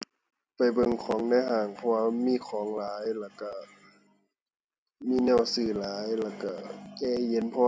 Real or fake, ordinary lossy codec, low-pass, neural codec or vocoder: real; none; none; none